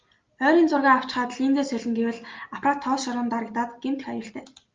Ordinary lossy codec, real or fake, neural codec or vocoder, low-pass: Opus, 24 kbps; real; none; 7.2 kHz